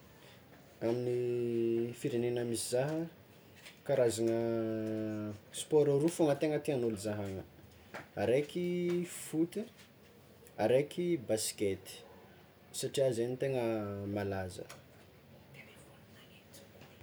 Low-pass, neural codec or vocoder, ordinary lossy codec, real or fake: none; none; none; real